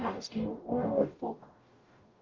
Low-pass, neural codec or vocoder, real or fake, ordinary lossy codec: 7.2 kHz; codec, 44.1 kHz, 0.9 kbps, DAC; fake; Opus, 32 kbps